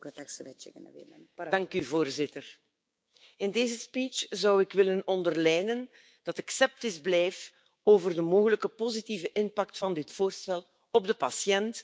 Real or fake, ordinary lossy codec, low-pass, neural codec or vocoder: fake; none; none; codec, 16 kHz, 6 kbps, DAC